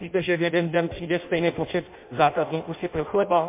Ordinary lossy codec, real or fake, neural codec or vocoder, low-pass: MP3, 24 kbps; fake; codec, 16 kHz in and 24 kHz out, 0.6 kbps, FireRedTTS-2 codec; 3.6 kHz